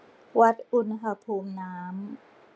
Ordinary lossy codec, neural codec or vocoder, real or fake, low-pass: none; none; real; none